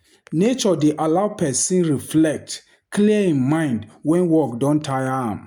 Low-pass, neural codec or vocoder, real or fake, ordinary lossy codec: 19.8 kHz; none; real; none